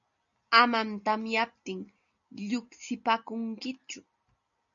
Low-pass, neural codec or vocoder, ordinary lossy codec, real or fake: 7.2 kHz; none; MP3, 96 kbps; real